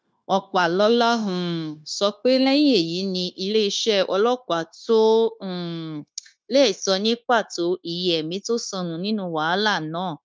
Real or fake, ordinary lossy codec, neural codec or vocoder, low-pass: fake; none; codec, 16 kHz, 0.9 kbps, LongCat-Audio-Codec; none